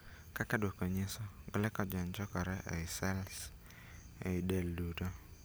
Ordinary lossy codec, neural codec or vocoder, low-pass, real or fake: none; none; none; real